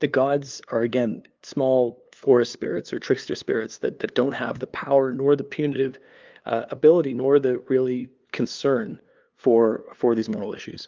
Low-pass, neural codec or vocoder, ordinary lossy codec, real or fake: 7.2 kHz; codec, 16 kHz, 2 kbps, FunCodec, trained on LibriTTS, 25 frames a second; Opus, 24 kbps; fake